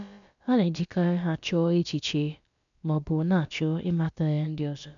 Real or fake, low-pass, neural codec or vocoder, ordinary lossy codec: fake; 7.2 kHz; codec, 16 kHz, about 1 kbps, DyCAST, with the encoder's durations; none